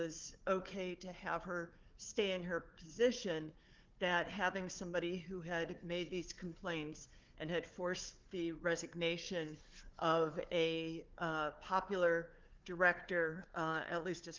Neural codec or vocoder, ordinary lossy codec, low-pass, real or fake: codec, 16 kHz, 4 kbps, FunCodec, trained on Chinese and English, 50 frames a second; Opus, 32 kbps; 7.2 kHz; fake